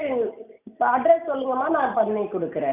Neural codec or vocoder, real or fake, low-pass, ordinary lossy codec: none; real; 3.6 kHz; none